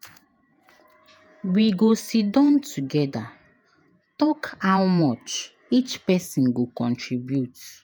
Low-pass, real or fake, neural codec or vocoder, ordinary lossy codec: none; fake; vocoder, 48 kHz, 128 mel bands, Vocos; none